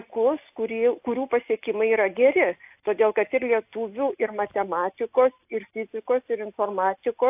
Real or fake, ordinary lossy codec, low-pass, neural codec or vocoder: real; AAC, 32 kbps; 3.6 kHz; none